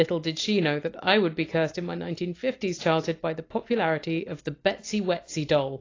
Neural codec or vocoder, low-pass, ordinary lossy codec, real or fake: none; 7.2 kHz; AAC, 32 kbps; real